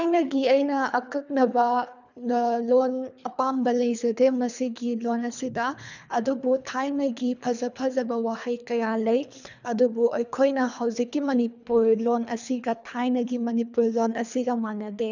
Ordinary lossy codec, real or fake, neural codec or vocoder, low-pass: none; fake; codec, 24 kHz, 3 kbps, HILCodec; 7.2 kHz